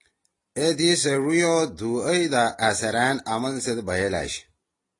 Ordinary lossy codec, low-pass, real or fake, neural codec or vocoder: AAC, 32 kbps; 10.8 kHz; real; none